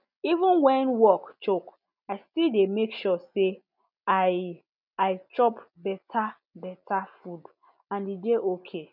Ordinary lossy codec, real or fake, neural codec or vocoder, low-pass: none; real; none; 5.4 kHz